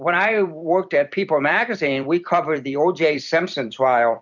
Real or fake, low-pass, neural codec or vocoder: fake; 7.2 kHz; vocoder, 44.1 kHz, 128 mel bands every 256 samples, BigVGAN v2